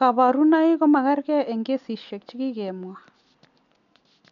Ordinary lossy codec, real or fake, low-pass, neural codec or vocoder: none; real; 7.2 kHz; none